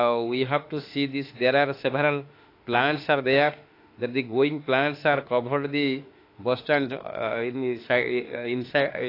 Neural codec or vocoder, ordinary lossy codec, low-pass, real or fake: autoencoder, 48 kHz, 32 numbers a frame, DAC-VAE, trained on Japanese speech; AAC, 32 kbps; 5.4 kHz; fake